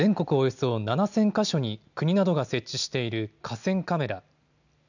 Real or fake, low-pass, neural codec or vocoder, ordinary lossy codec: real; 7.2 kHz; none; none